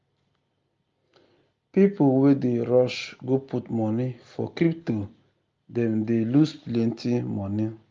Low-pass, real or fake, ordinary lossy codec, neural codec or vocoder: 7.2 kHz; real; Opus, 32 kbps; none